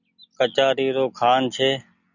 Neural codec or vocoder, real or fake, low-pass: none; real; 7.2 kHz